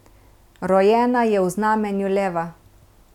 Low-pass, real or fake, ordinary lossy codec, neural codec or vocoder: 19.8 kHz; real; none; none